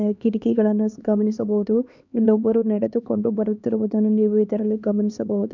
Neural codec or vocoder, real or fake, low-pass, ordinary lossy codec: codec, 16 kHz, 2 kbps, X-Codec, HuBERT features, trained on LibriSpeech; fake; 7.2 kHz; none